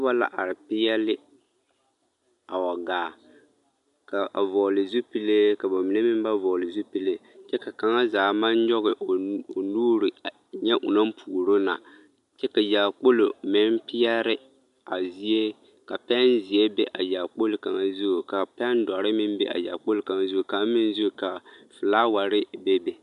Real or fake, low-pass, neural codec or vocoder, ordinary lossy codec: real; 10.8 kHz; none; MP3, 96 kbps